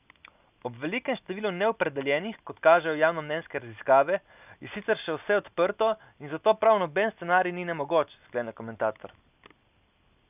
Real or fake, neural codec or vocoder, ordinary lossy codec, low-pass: real; none; none; 3.6 kHz